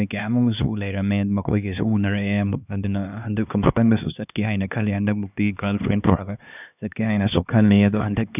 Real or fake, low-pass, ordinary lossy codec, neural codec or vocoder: fake; 3.6 kHz; none; codec, 16 kHz, 2 kbps, X-Codec, HuBERT features, trained on LibriSpeech